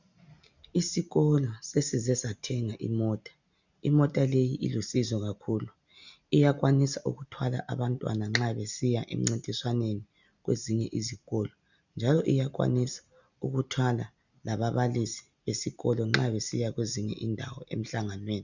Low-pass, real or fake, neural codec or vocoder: 7.2 kHz; real; none